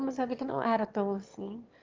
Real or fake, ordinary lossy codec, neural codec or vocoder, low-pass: fake; Opus, 24 kbps; autoencoder, 22.05 kHz, a latent of 192 numbers a frame, VITS, trained on one speaker; 7.2 kHz